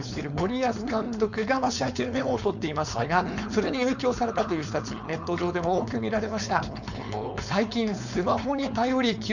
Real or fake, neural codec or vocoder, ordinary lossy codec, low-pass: fake; codec, 16 kHz, 4.8 kbps, FACodec; none; 7.2 kHz